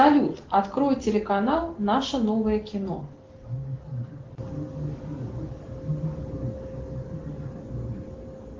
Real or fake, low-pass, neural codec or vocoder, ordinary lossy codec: real; 7.2 kHz; none; Opus, 16 kbps